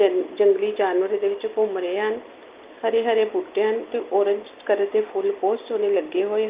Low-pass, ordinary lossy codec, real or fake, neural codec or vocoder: 3.6 kHz; Opus, 24 kbps; real; none